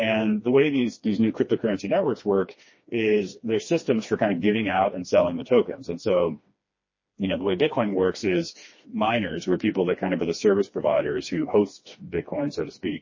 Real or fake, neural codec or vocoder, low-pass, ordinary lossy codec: fake; codec, 16 kHz, 2 kbps, FreqCodec, smaller model; 7.2 kHz; MP3, 32 kbps